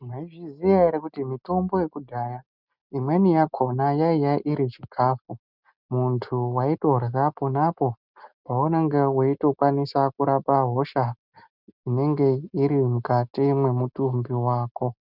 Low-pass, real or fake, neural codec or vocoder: 5.4 kHz; real; none